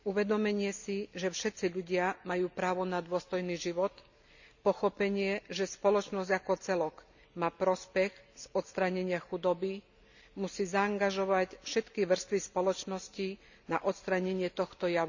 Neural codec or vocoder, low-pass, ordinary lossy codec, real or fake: none; 7.2 kHz; none; real